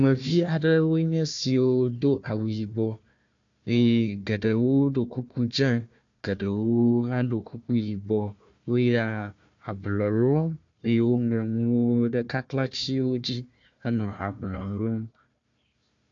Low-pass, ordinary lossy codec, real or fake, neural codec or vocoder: 7.2 kHz; AAC, 64 kbps; fake; codec, 16 kHz, 1 kbps, FunCodec, trained on Chinese and English, 50 frames a second